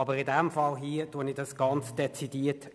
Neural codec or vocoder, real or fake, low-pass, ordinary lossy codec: none; real; none; none